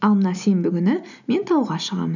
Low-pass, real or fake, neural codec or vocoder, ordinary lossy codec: 7.2 kHz; real; none; none